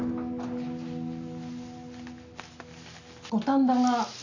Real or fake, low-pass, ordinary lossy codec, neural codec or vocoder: real; 7.2 kHz; none; none